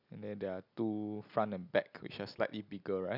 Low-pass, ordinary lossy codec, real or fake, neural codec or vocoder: 5.4 kHz; none; real; none